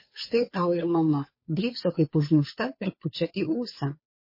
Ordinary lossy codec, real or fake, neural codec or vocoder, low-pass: MP3, 24 kbps; fake; codec, 16 kHz, 2 kbps, FunCodec, trained on Chinese and English, 25 frames a second; 5.4 kHz